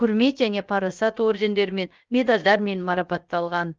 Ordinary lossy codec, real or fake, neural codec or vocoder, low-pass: Opus, 32 kbps; fake; codec, 16 kHz, 0.7 kbps, FocalCodec; 7.2 kHz